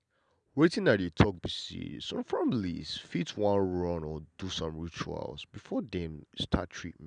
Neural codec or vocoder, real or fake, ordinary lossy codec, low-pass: none; real; none; 9.9 kHz